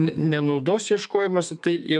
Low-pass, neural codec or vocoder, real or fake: 10.8 kHz; codec, 32 kHz, 1.9 kbps, SNAC; fake